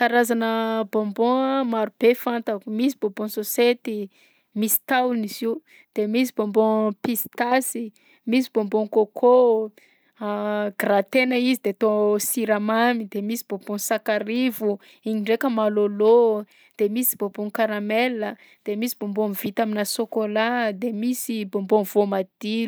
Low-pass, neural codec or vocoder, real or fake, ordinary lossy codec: none; none; real; none